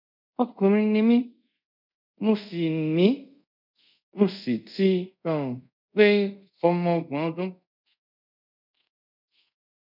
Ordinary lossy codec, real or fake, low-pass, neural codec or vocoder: none; fake; 5.4 kHz; codec, 24 kHz, 0.5 kbps, DualCodec